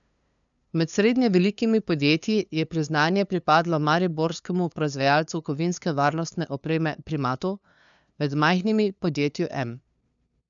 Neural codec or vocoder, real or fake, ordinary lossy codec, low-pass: codec, 16 kHz, 2 kbps, FunCodec, trained on LibriTTS, 25 frames a second; fake; none; 7.2 kHz